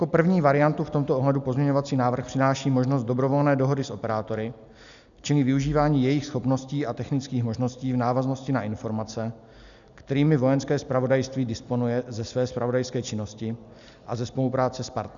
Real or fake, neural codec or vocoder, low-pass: real; none; 7.2 kHz